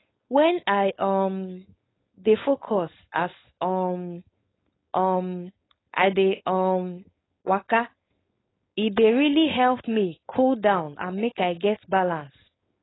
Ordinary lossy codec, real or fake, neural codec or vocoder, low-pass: AAC, 16 kbps; fake; codec, 16 kHz, 4.8 kbps, FACodec; 7.2 kHz